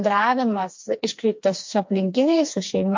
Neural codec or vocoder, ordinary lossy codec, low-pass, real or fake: codec, 16 kHz, 2 kbps, FreqCodec, smaller model; MP3, 48 kbps; 7.2 kHz; fake